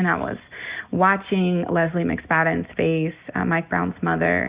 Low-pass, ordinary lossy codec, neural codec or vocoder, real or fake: 3.6 kHz; Opus, 64 kbps; none; real